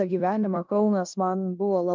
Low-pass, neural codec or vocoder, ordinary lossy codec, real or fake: 7.2 kHz; codec, 16 kHz in and 24 kHz out, 0.9 kbps, LongCat-Audio-Codec, four codebook decoder; Opus, 24 kbps; fake